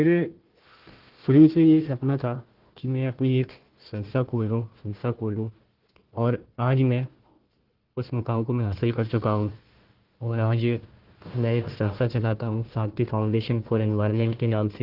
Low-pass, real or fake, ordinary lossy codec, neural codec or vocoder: 5.4 kHz; fake; Opus, 16 kbps; codec, 16 kHz, 1 kbps, FunCodec, trained on Chinese and English, 50 frames a second